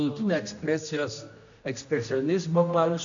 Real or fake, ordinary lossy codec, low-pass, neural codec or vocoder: fake; AAC, 64 kbps; 7.2 kHz; codec, 16 kHz, 1 kbps, X-Codec, HuBERT features, trained on general audio